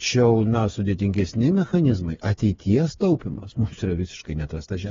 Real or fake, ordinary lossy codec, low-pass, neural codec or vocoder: fake; AAC, 24 kbps; 7.2 kHz; codec, 16 kHz, 4 kbps, FreqCodec, smaller model